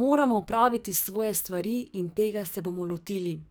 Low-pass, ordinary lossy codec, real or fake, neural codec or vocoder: none; none; fake; codec, 44.1 kHz, 2.6 kbps, SNAC